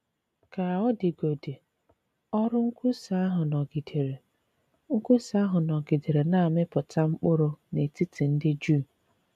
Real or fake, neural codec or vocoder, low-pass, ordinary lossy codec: real; none; 14.4 kHz; MP3, 96 kbps